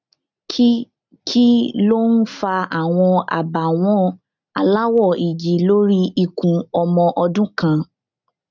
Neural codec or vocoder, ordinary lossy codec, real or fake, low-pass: none; none; real; 7.2 kHz